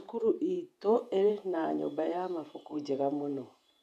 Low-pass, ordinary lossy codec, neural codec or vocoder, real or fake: 14.4 kHz; none; vocoder, 48 kHz, 128 mel bands, Vocos; fake